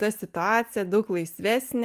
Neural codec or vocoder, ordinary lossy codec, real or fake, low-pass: none; Opus, 32 kbps; real; 14.4 kHz